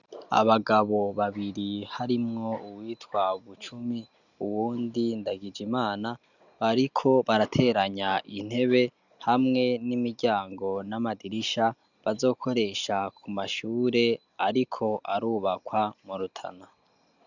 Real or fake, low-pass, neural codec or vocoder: real; 7.2 kHz; none